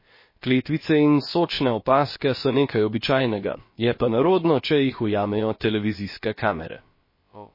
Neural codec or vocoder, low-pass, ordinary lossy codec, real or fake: codec, 16 kHz, about 1 kbps, DyCAST, with the encoder's durations; 5.4 kHz; MP3, 24 kbps; fake